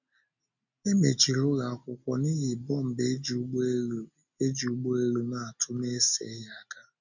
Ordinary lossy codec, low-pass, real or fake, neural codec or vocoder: none; 7.2 kHz; real; none